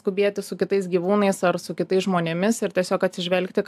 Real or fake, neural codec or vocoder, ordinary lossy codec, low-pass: real; none; AAC, 96 kbps; 14.4 kHz